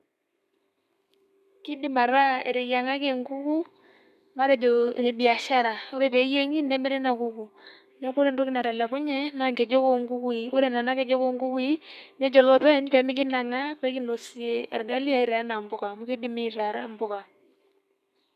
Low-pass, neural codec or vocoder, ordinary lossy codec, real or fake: 14.4 kHz; codec, 32 kHz, 1.9 kbps, SNAC; none; fake